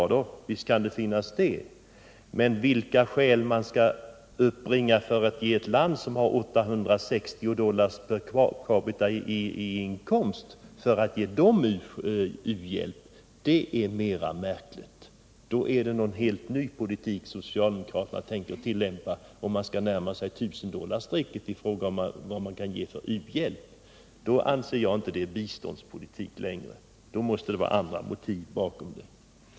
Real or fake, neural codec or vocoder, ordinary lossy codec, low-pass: real; none; none; none